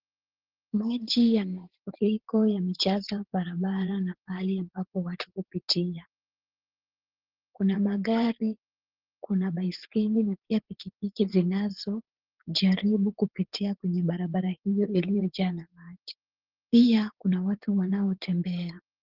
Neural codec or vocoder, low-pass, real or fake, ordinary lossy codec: vocoder, 44.1 kHz, 80 mel bands, Vocos; 5.4 kHz; fake; Opus, 16 kbps